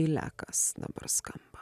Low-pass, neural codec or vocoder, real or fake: 14.4 kHz; none; real